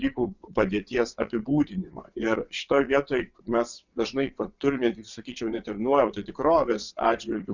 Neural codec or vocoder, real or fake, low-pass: vocoder, 22.05 kHz, 80 mel bands, WaveNeXt; fake; 7.2 kHz